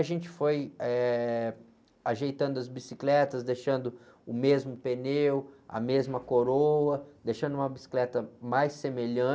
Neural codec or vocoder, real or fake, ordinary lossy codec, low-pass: none; real; none; none